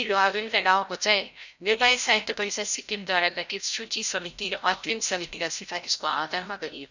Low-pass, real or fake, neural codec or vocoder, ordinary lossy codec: 7.2 kHz; fake; codec, 16 kHz, 0.5 kbps, FreqCodec, larger model; none